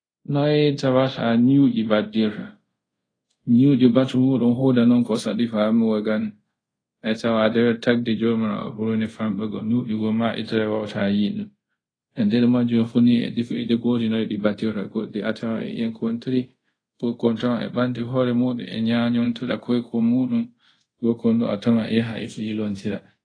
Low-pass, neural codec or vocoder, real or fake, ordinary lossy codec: 9.9 kHz; codec, 24 kHz, 0.5 kbps, DualCodec; fake; AAC, 32 kbps